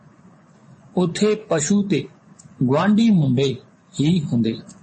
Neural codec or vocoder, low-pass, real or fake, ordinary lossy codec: vocoder, 24 kHz, 100 mel bands, Vocos; 10.8 kHz; fake; MP3, 32 kbps